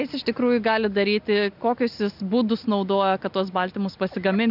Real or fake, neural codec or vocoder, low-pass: real; none; 5.4 kHz